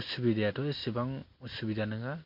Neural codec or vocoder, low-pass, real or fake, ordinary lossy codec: none; 5.4 kHz; real; MP3, 32 kbps